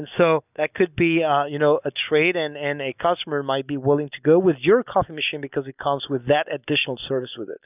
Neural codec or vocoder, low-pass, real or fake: none; 3.6 kHz; real